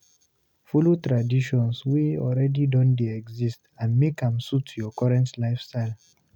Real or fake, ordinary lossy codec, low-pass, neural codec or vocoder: real; none; 19.8 kHz; none